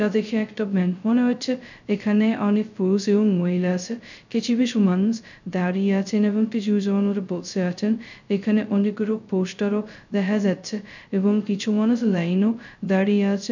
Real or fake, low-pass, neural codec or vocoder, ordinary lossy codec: fake; 7.2 kHz; codec, 16 kHz, 0.2 kbps, FocalCodec; none